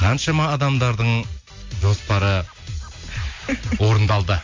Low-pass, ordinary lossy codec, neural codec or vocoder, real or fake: 7.2 kHz; MP3, 48 kbps; none; real